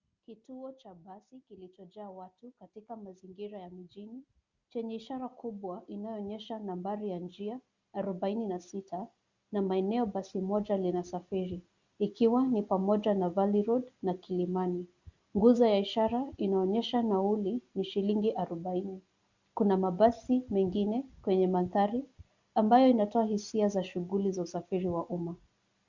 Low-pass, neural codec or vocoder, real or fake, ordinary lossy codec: 7.2 kHz; none; real; Opus, 64 kbps